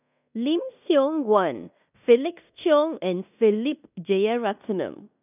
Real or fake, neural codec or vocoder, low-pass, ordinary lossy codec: fake; codec, 16 kHz in and 24 kHz out, 0.9 kbps, LongCat-Audio-Codec, fine tuned four codebook decoder; 3.6 kHz; none